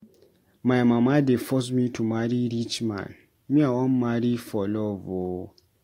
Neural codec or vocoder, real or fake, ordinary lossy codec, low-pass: none; real; AAC, 48 kbps; 19.8 kHz